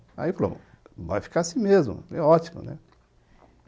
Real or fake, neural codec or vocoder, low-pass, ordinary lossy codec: real; none; none; none